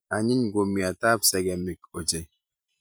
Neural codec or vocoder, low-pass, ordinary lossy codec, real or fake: vocoder, 44.1 kHz, 128 mel bands every 512 samples, BigVGAN v2; none; none; fake